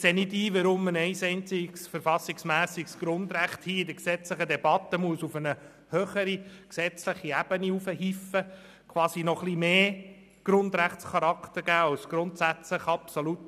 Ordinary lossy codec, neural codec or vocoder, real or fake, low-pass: none; none; real; 14.4 kHz